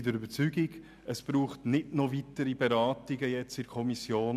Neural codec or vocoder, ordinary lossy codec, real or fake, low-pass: none; none; real; 14.4 kHz